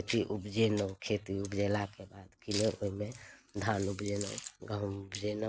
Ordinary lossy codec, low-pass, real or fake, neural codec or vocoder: none; none; real; none